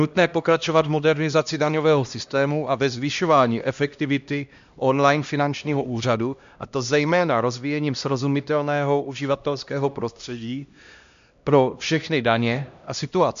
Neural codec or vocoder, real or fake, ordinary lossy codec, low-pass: codec, 16 kHz, 1 kbps, X-Codec, HuBERT features, trained on LibriSpeech; fake; MP3, 64 kbps; 7.2 kHz